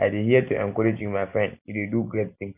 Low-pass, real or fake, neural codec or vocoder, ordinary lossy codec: 3.6 kHz; real; none; none